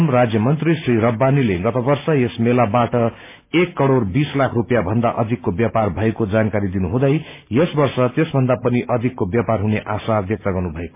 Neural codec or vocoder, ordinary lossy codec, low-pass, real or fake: none; MP3, 24 kbps; 3.6 kHz; real